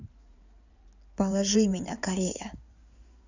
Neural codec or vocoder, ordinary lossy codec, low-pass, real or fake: codec, 16 kHz in and 24 kHz out, 2.2 kbps, FireRedTTS-2 codec; none; 7.2 kHz; fake